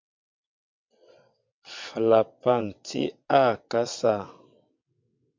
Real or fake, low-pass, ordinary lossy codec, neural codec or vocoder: fake; 7.2 kHz; MP3, 64 kbps; vocoder, 22.05 kHz, 80 mel bands, WaveNeXt